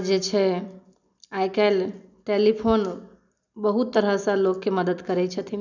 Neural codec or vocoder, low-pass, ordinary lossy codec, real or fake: none; 7.2 kHz; none; real